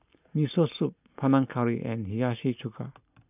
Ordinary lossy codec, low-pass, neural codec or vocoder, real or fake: none; 3.6 kHz; none; real